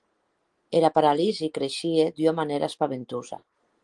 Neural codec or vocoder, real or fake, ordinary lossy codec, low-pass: none; real; Opus, 24 kbps; 10.8 kHz